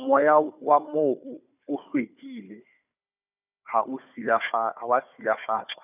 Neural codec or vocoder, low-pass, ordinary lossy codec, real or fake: codec, 16 kHz, 4 kbps, FunCodec, trained on Chinese and English, 50 frames a second; 3.6 kHz; none; fake